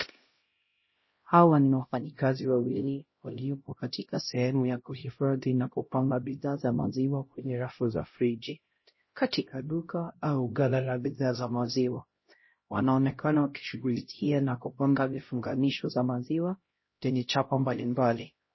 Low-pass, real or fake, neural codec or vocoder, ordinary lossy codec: 7.2 kHz; fake; codec, 16 kHz, 0.5 kbps, X-Codec, HuBERT features, trained on LibriSpeech; MP3, 24 kbps